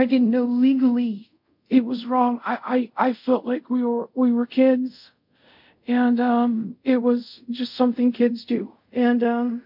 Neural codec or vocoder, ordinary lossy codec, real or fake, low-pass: codec, 24 kHz, 0.5 kbps, DualCodec; MP3, 48 kbps; fake; 5.4 kHz